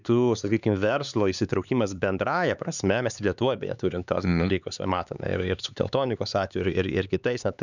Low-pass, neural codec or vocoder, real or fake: 7.2 kHz; codec, 16 kHz, 4 kbps, X-Codec, HuBERT features, trained on LibriSpeech; fake